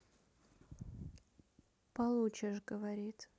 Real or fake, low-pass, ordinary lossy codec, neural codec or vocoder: real; none; none; none